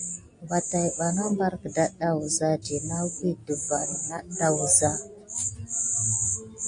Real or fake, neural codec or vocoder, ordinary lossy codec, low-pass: real; none; AAC, 64 kbps; 9.9 kHz